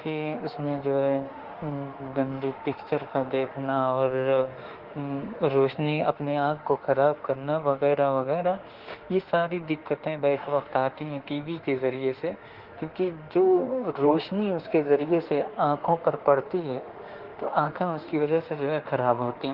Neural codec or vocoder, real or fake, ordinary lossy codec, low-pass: autoencoder, 48 kHz, 32 numbers a frame, DAC-VAE, trained on Japanese speech; fake; Opus, 16 kbps; 5.4 kHz